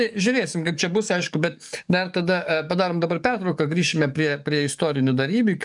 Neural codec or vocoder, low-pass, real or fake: codec, 44.1 kHz, 7.8 kbps, DAC; 10.8 kHz; fake